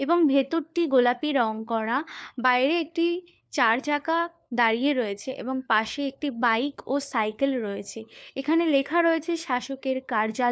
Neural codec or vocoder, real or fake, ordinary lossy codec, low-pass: codec, 16 kHz, 4 kbps, FunCodec, trained on LibriTTS, 50 frames a second; fake; none; none